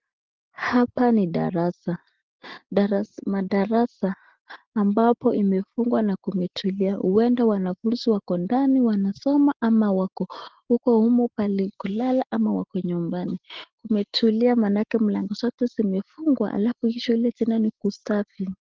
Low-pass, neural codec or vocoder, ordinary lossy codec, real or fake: 7.2 kHz; none; Opus, 16 kbps; real